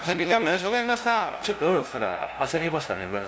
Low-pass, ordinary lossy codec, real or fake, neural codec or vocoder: none; none; fake; codec, 16 kHz, 0.5 kbps, FunCodec, trained on LibriTTS, 25 frames a second